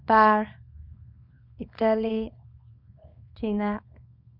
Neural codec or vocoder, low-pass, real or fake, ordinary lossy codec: codec, 24 kHz, 0.9 kbps, WavTokenizer, small release; 5.4 kHz; fake; none